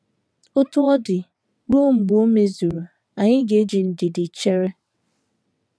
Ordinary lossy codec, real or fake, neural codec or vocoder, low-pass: none; fake; vocoder, 22.05 kHz, 80 mel bands, WaveNeXt; none